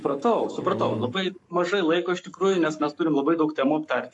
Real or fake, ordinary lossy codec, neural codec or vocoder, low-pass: fake; AAC, 64 kbps; codec, 44.1 kHz, 7.8 kbps, Pupu-Codec; 10.8 kHz